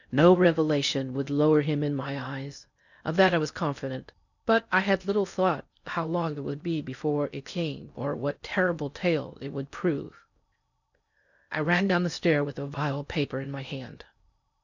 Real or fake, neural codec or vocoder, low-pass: fake; codec, 16 kHz in and 24 kHz out, 0.6 kbps, FocalCodec, streaming, 4096 codes; 7.2 kHz